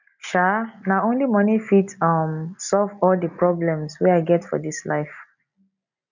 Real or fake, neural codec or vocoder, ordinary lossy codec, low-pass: real; none; none; 7.2 kHz